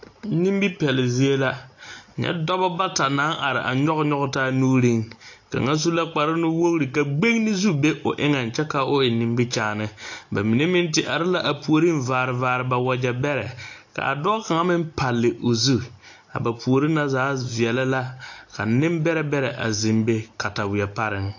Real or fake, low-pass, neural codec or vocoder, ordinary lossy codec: real; 7.2 kHz; none; AAC, 48 kbps